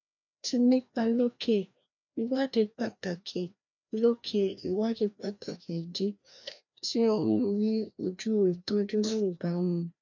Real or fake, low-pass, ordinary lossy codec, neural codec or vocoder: fake; 7.2 kHz; none; codec, 16 kHz, 1 kbps, FreqCodec, larger model